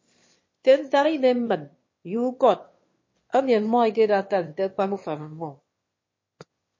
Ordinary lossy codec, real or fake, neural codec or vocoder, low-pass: MP3, 32 kbps; fake; autoencoder, 22.05 kHz, a latent of 192 numbers a frame, VITS, trained on one speaker; 7.2 kHz